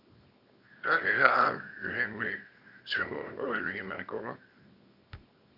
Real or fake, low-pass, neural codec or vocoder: fake; 5.4 kHz; codec, 24 kHz, 0.9 kbps, WavTokenizer, small release